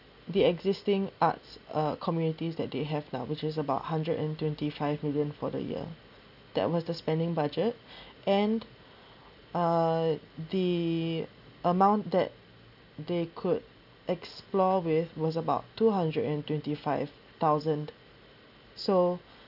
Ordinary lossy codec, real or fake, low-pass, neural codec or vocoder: none; real; 5.4 kHz; none